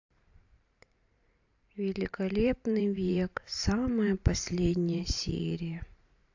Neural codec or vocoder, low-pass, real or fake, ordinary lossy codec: vocoder, 44.1 kHz, 128 mel bands every 512 samples, BigVGAN v2; 7.2 kHz; fake; AAC, 48 kbps